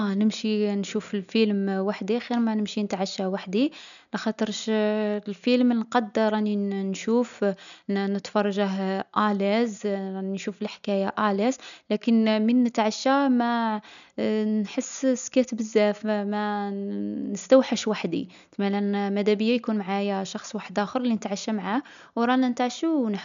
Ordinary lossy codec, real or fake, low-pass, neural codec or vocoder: none; real; 7.2 kHz; none